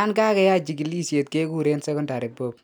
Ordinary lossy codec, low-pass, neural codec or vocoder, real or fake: none; none; none; real